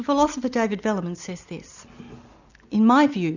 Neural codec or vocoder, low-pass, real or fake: none; 7.2 kHz; real